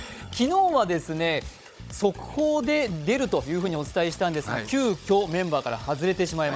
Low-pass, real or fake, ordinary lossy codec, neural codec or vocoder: none; fake; none; codec, 16 kHz, 16 kbps, FunCodec, trained on Chinese and English, 50 frames a second